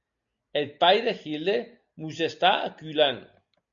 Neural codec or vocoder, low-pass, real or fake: none; 7.2 kHz; real